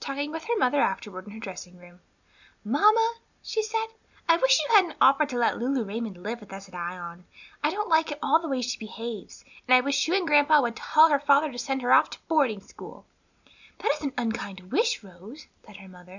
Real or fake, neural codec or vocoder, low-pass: real; none; 7.2 kHz